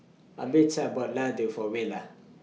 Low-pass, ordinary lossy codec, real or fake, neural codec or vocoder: none; none; real; none